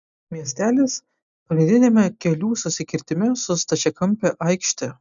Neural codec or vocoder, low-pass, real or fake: none; 7.2 kHz; real